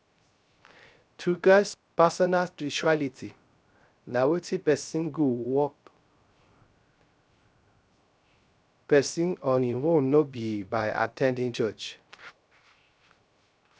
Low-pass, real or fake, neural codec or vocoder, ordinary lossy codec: none; fake; codec, 16 kHz, 0.3 kbps, FocalCodec; none